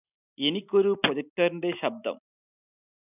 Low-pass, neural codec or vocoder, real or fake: 3.6 kHz; none; real